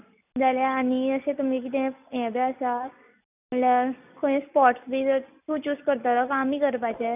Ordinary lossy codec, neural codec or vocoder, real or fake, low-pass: none; none; real; 3.6 kHz